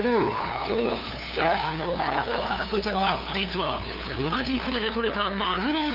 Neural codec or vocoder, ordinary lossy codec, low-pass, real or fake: codec, 16 kHz, 2 kbps, FunCodec, trained on LibriTTS, 25 frames a second; AAC, 48 kbps; 5.4 kHz; fake